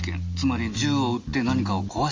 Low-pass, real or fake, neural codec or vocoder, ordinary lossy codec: 7.2 kHz; real; none; Opus, 32 kbps